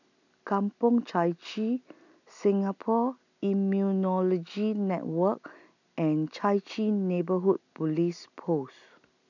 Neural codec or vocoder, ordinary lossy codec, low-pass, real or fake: none; none; 7.2 kHz; real